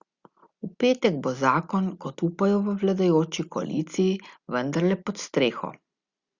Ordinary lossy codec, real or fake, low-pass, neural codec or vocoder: Opus, 64 kbps; real; 7.2 kHz; none